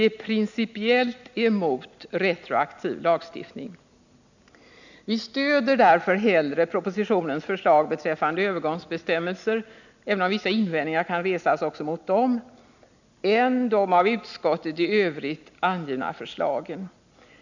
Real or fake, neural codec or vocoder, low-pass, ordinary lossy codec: real; none; 7.2 kHz; none